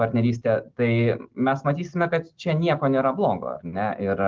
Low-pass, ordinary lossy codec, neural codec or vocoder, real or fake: 7.2 kHz; Opus, 16 kbps; none; real